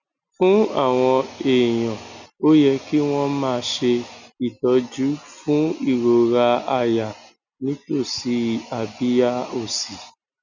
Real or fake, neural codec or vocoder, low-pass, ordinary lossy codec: real; none; 7.2 kHz; none